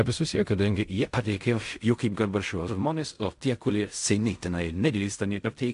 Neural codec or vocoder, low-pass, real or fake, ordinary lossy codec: codec, 16 kHz in and 24 kHz out, 0.4 kbps, LongCat-Audio-Codec, fine tuned four codebook decoder; 10.8 kHz; fake; AAC, 64 kbps